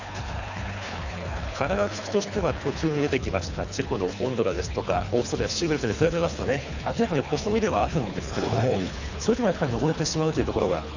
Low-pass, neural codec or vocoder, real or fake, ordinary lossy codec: 7.2 kHz; codec, 24 kHz, 3 kbps, HILCodec; fake; none